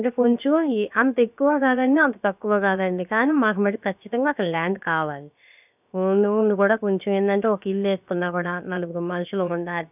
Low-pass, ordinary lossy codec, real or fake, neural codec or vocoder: 3.6 kHz; none; fake; codec, 16 kHz, about 1 kbps, DyCAST, with the encoder's durations